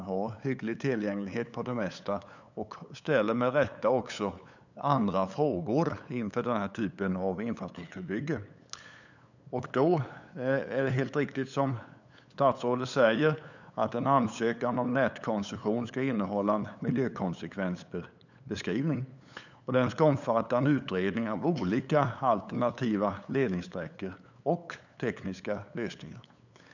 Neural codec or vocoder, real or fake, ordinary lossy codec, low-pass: codec, 16 kHz, 8 kbps, FunCodec, trained on LibriTTS, 25 frames a second; fake; none; 7.2 kHz